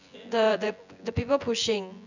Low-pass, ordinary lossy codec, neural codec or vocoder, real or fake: 7.2 kHz; none; vocoder, 24 kHz, 100 mel bands, Vocos; fake